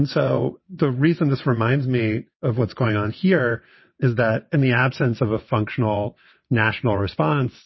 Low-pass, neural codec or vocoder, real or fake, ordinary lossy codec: 7.2 kHz; vocoder, 22.05 kHz, 80 mel bands, WaveNeXt; fake; MP3, 24 kbps